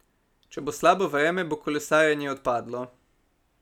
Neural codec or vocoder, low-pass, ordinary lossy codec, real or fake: none; 19.8 kHz; none; real